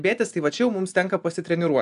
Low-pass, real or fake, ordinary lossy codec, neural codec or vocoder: 10.8 kHz; real; AAC, 96 kbps; none